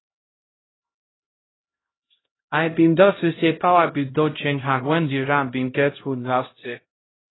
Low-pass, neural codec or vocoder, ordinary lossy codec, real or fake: 7.2 kHz; codec, 16 kHz, 0.5 kbps, X-Codec, HuBERT features, trained on LibriSpeech; AAC, 16 kbps; fake